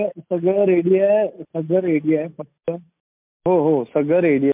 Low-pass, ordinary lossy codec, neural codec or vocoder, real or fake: 3.6 kHz; MP3, 32 kbps; none; real